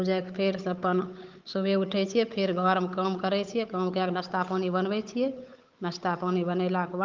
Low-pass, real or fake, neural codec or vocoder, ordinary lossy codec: 7.2 kHz; fake; codec, 16 kHz, 8 kbps, FunCodec, trained on Chinese and English, 25 frames a second; Opus, 24 kbps